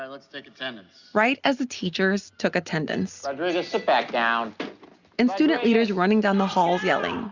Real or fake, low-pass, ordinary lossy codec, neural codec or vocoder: real; 7.2 kHz; Opus, 64 kbps; none